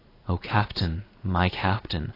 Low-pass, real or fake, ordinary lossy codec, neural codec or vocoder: 5.4 kHz; fake; AAC, 24 kbps; vocoder, 44.1 kHz, 128 mel bands every 256 samples, BigVGAN v2